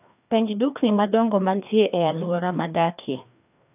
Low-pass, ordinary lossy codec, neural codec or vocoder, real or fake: 3.6 kHz; none; codec, 16 kHz, 2 kbps, FreqCodec, larger model; fake